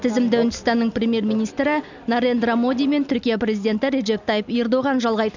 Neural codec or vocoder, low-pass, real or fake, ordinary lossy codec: none; 7.2 kHz; real; none